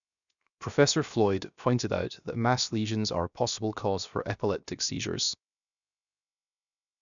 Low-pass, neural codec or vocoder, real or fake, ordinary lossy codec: 7.2 kHz; codec, 16 kHz, 0.7 kbps, FocalCodec; fake; none